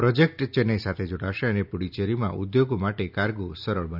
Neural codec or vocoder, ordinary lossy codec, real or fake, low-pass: none; none; real; 5.4 kHz